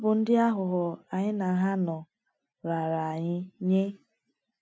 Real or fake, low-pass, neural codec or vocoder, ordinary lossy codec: real; none; none; none